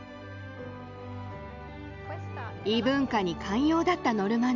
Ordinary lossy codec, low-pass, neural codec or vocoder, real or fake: none; 7.2 kHz; none; real